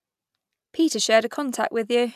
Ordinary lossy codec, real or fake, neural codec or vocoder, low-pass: none; real; none; 14.4 kHz